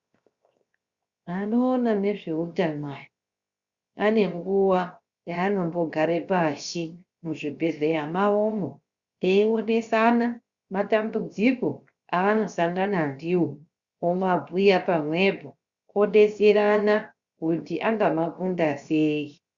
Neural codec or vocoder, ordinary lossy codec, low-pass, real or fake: codec, 16 kHz, 0.7 kbps, FocalCodec; Opus, 64 kbps; 7.2 kHz; fake